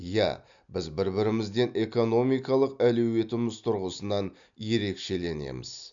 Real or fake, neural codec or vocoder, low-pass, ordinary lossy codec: real; none; 7.2 kHz; none